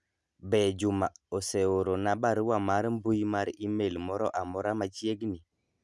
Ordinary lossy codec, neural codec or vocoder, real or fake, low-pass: none; none; real; none